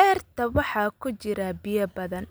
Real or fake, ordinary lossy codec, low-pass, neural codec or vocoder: real; none; none; none